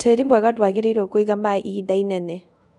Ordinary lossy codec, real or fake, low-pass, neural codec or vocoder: none; fake; 10.8 kHz; codec, 24 kHz, 0.5 kbps, DualCodec